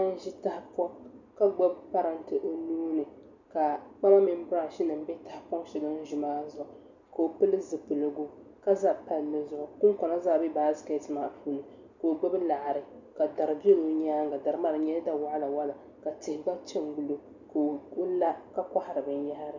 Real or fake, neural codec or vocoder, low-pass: real; none; 7.2 kHz